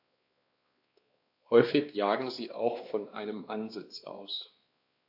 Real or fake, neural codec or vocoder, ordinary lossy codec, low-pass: fake; codec, 16 kHz, 2 kbps, X-Codec, WavLM features, trained on Multilingual LibriSpeech; AAC, 32 kbps; 5.4 kHz